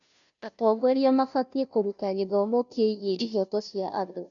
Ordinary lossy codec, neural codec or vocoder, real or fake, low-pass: none; codec, 16 kHz, 0.5 kbps, FunCodec, trained on Chinese and English, 25 frames a second; fake; 7.2 kHz